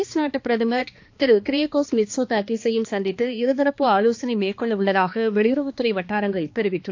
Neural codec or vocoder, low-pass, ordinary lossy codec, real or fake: codec, 16 kHz, 2 kbps, X-Codec, HuBERT features, trained on balanced general audio; 7.2 kHz; AAC, 48 kbps; fake